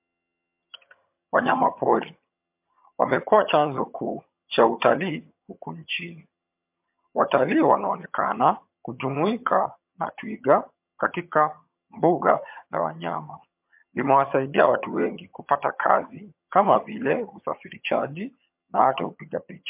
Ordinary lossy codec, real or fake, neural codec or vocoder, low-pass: MP3, 32 kbps; fake; vocoder, 22.05 kHz, 80 mel bands, HiFi-GAN; 3.6 kHz